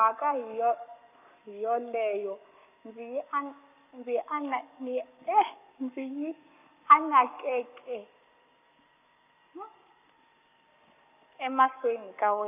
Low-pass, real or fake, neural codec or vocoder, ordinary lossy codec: 3.6 kHz; fake; codec, 44.1 kHz, 7.8 kbps, Pupu-Codec; none